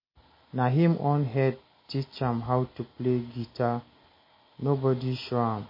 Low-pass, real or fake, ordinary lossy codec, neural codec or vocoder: 5.4 kHz; real; MP3, 24 kbps; none